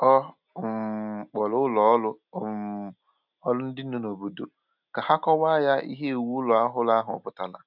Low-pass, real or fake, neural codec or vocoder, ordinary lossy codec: 5.4 kHz; real; none; none